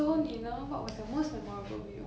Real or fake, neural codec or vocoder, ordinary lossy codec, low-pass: real; none; none; none